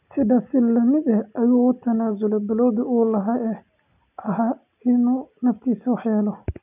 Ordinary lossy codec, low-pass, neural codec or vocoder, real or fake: none; 3.6 kHz; none; real